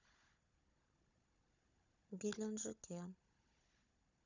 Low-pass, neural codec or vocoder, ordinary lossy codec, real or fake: 7.2 kHz; codec, 16 kHz, 16 kbps, FunCodec, trained on Chinese and English, 50 frames a second; none; fake